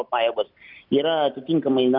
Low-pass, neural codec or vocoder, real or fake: 5.4 kHz; none; real